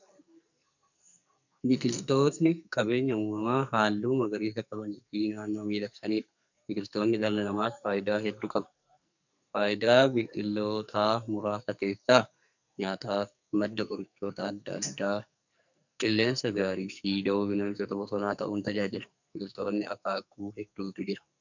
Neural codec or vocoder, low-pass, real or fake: codec, 44.1 kHz, 2.6 kbps, SNAC; 7.2 kHz; fake